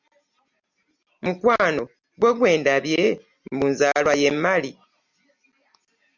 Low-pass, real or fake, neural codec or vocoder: 7.2 kHz; real; none